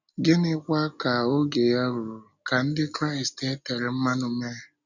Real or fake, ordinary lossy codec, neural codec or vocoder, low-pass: real; none; none; 7.2 kHz